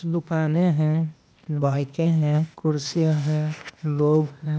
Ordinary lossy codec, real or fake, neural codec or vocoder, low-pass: none; fake; codec, 16 kHz, 0.8 kbps, ZipCodec; none